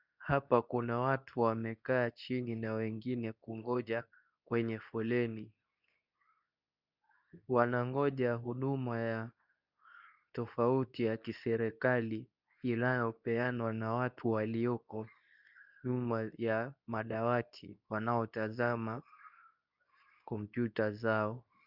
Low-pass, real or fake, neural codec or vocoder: 5.4 kHz; fake; codec, 24 kHz, 0.9 kbps, WavTokenizer, medium speech release version 2